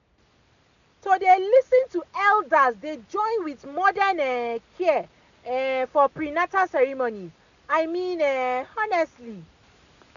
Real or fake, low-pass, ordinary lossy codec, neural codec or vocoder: real; 7.2 kHz; none; none